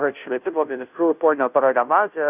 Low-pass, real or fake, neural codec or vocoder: 3.6 kHz; fake; codec, 16 kHz, 0.5 kbps, FunCodec, trained on Chinese and English, 25 frames a second